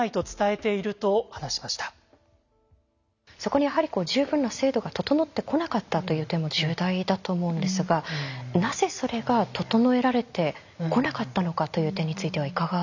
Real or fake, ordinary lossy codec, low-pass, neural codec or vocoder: real; none; 7.2 kHz; none